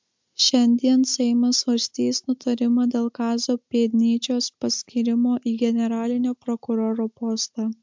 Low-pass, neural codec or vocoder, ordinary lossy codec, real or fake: 7.2 kHz; none; MP3, 64 kbps; real